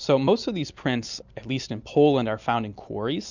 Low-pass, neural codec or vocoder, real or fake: 7.2 kHz; none; real